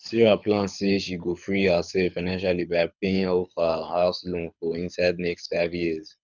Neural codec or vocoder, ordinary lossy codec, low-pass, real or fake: codec, 24 kHz, 6 kbps, HILCodec; none; 7.2 kHz; fake